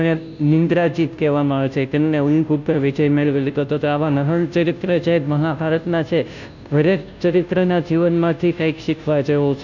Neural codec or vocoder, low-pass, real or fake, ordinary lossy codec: codec, 16 kHz, 0.5 kbps, FunCodec, trained on Chinese and English, 25 frames a second; 7.2 kHz; fake; none